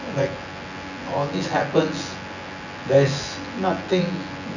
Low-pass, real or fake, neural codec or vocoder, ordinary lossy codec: 7.2 kHz; fake; vocoder, 24 kHz, 100 mel bands, Vocos; none